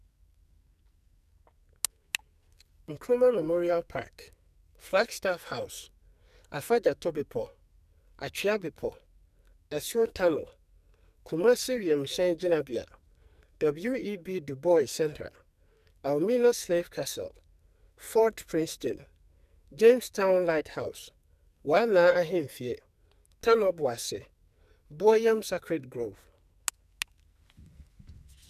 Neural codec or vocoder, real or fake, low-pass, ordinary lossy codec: codec, 44.1 kHz, 2.6 kbps, SNAC; fake; 14.4 kHz; none